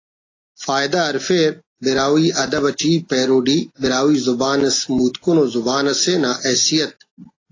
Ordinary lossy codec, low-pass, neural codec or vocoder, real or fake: AAC, 32 kbps; 7.2 kHz; none; real